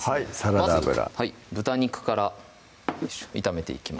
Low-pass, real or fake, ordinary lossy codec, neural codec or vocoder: none; real; none; none